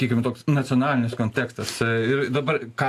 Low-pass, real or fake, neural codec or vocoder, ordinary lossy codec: 14.4 kHz; real; none; AAC, 64 kbps